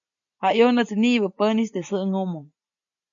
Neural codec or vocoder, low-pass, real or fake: none; 7.2 kHz; real